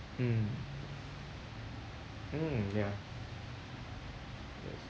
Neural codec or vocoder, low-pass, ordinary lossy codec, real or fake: none; none; none; real